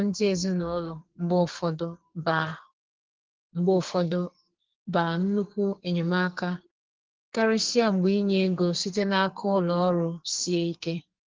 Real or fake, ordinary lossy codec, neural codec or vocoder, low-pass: fake; Opus, 16 kbps; codec, 44.1 kHz, 2.6 kbps, SNAC; 7.2 kHz